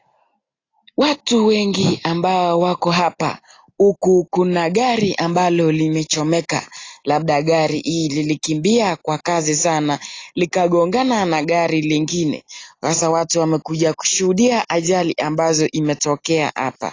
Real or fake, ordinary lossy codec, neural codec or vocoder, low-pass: real; AAC, 32 kbps; none; 7.2 kHz